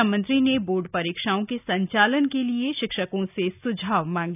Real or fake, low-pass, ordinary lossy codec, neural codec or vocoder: real; 3.6 kHz; none; none